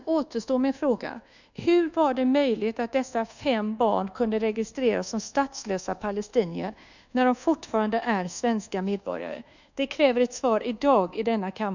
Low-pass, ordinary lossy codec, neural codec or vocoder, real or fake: 7.2 kHz; none; codec, 24 kHz, 1.2 kbps, DualCodec; fake